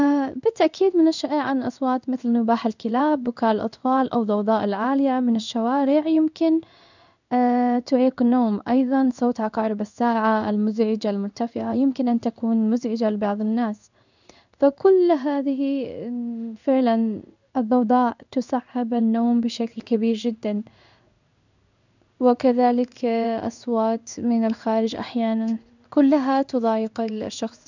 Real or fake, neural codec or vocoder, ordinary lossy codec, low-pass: fake; codec, 16 kHz in and 24 kHz out, 1 kbps, XY-Tokenizer; none; 7.2 kHz